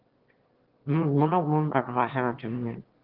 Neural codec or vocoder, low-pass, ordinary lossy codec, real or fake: autoencoder, 22.05 kHz, a latent of 192 numbers a frame, VITS, trained on one speaker; 5.4 kHz; Opus, 16 kbps; fake